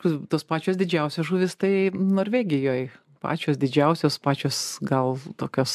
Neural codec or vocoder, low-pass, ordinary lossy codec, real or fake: none; 14.4 kHz; MP3, 96 kbps; real